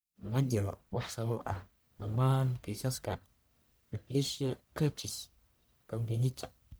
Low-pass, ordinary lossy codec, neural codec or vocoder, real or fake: none; none; codec, 44.1 kHz, 1.7 kbps, Pupu-Codec; fake